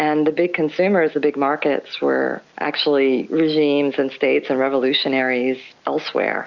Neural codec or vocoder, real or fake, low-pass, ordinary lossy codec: none; real; 7.2 kHz; Opus, 64 kbps